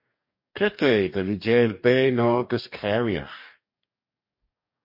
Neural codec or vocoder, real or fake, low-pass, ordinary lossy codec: codec, 44.1 kHz, 2.6 kbps, DAC; fake; 5.4 kHz; MP3, 32 kbps